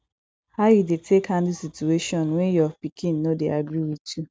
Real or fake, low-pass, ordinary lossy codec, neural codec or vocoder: real; none; none; none